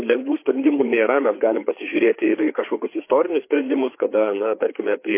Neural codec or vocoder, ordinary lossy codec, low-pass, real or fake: codec, 16 kHz, 4 kbps, FreqCodec, larger model; MP3, 24 kbps; 3.6 kHz; fake